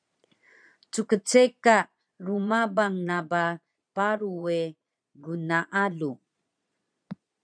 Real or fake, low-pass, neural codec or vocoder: fake; 9.9 kHz; vocoder, 22.05 kHz, 80 mel bands, Vocos